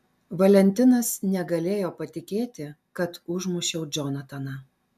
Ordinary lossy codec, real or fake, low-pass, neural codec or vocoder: MP3, 96 kbps; real; 14.4 kHz; none